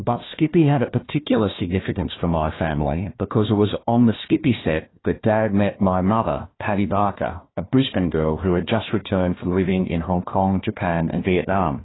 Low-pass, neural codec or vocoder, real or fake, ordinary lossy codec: 7.2 kHz; codec, 16 kHz, 1 kbps, FunCodec, trained on Chinese and English, 50 frames a second; fake; AAC, 16 kbps